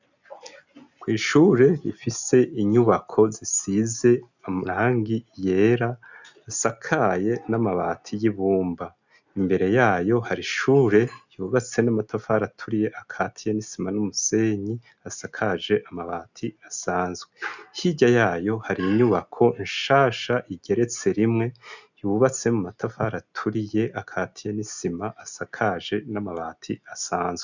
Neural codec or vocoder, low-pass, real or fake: none; 7.2 kHz; real